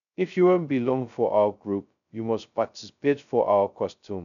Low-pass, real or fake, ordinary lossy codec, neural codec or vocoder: 7.2 kHz; fake; none; codec, 16 kHz, 0.2 kbps, FocalCodec